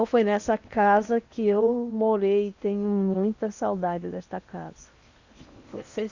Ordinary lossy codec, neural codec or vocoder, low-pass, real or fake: Opus, 64 kbps; codec, 16 kHz in and 24 kHz out, 0.8 kbps, FocalCodec, streaming, 65536 codes; 7.2 kHz; fake